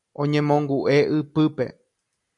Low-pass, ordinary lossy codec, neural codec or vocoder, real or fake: 10.8 kHz; AAC, 64 kbps; none; real